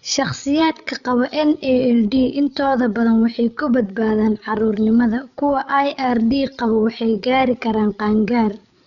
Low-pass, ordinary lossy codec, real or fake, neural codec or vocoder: 7.2 kHz; none; fake; codec, 16 kHz, 8 kbps, FreqCodec, larger model